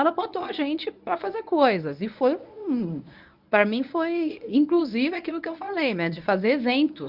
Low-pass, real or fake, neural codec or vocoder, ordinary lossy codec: 5.4 kHz; fake; codec, 24 kHz, 0.9 kbps, WavTokenizer, medium speech release version 1; none